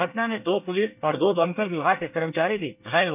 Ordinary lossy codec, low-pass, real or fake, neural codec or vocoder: none; 3.6 kHz; fake; codec, 24 kHz, 1 kbps, SNAC